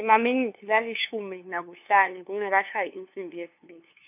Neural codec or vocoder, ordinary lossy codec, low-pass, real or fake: codec, 16 kHz, 2 kbps, FunCodec, trained on LibriTTS, 25 frames a second; none; 3.6 kHz; fake